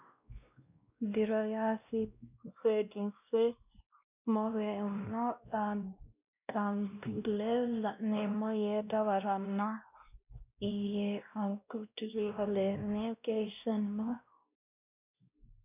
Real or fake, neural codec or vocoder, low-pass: fake; codec, 16 kHz, 1 kbps, X-Codec, WavLM features, trained on Multilingual LibriSpeech; 3.6 kHz